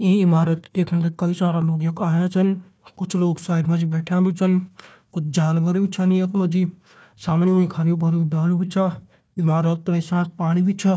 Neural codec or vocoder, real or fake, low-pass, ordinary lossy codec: codec, 16 kHz, 1 kbps, FunCodec, trained on Chinese and English, 50 frames a second; fake; none; none